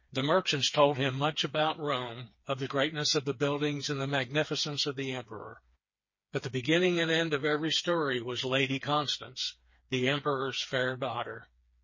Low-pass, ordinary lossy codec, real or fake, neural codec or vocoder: 7.2 kHz; MP3, 32 kbps; fake; codec, 16 kHz, 4 kbps, FreqCodec, smaller model